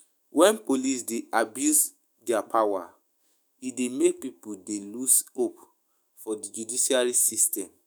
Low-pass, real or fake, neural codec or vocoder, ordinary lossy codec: none; fake; autoencoder, 48 kHz, 128 numbers a frame, DAC-VAE, trained on Japanese speech; none